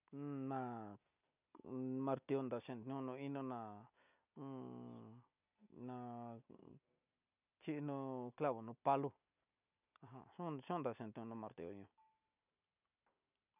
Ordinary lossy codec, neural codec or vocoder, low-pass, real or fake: none; none; 3.6 kHz; real